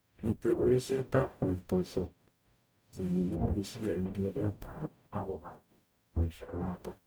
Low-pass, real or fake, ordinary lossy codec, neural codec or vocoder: none; fake; none; codec, 44.1 kHz, 0.9 kbps, DAC